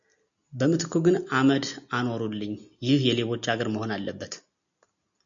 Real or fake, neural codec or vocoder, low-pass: real; none; 7.2 kHz